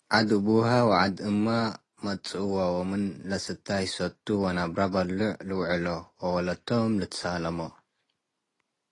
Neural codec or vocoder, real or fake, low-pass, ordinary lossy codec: none; real; 10.8 kHz; AAC, 32 kbps